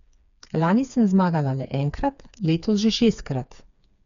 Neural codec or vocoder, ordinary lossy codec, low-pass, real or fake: codec, 16 kHz, 4 kbps, FreqCodec, smaller model; none; 7.2 kHz; fake